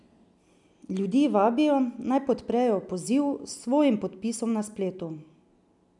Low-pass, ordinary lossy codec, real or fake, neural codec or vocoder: 10.8 kHz; none; real; none